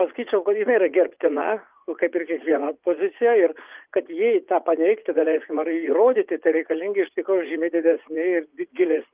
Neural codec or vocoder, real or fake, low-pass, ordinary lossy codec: vocoder, 44.1 kHz, 80 mel bands, Vocos; fake; 3.6 kHz; Opus, 32 kbps